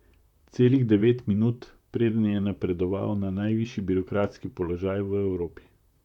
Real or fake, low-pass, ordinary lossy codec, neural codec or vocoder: fake; 19.8 kHz; none; vocoder, 44.1 kHz, 128 mel bands, Pupu-Vocoder